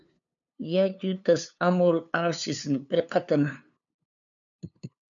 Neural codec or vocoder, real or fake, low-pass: codec, 16 kHz, 2 kbps, FunCodec, trained on LibriTTS, 25 frames a second; fake; 7.2 kHz